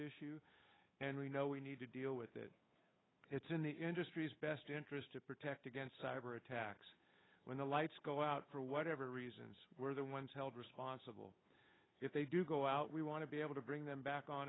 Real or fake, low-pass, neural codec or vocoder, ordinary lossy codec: fake; 7.2 kHz; codec, 16 kHz, 8 kbps, FunCodec, trained on Chinese and English, 25 frames a second; AAC, 16 kbps